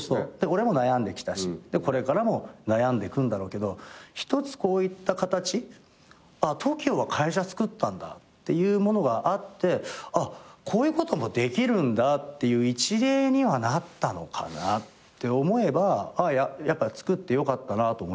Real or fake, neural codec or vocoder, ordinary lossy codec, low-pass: real; none; none; none